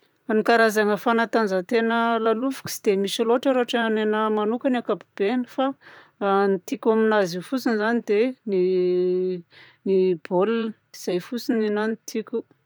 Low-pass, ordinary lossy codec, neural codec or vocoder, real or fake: none; none; vocoder, 44.1 kHz, 128 mel bands, Pupu-Vocoder; fake